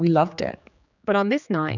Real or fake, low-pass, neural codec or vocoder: fake; 7.2 kHz; codec, 16 kHz, 4 kbps, X-Codec, HuBERT features, trained on general audio